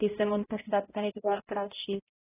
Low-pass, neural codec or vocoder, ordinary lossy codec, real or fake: 3.6 kHz; codec, 16 kHz, 0.5 kbps, X-Codec, HuBERT features, trained on balanced general audio; AAC, 16 kbps; fake